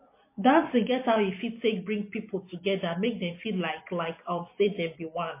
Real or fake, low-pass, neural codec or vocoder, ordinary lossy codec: real; 3.6 kHz; none; MP3, 24 kbps